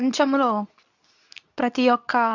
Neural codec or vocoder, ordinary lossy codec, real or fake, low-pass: codec, 24 kHz, 0.9 kbps, WavTokenizer, medium speech release version 2; none; fake; 7.2 kHz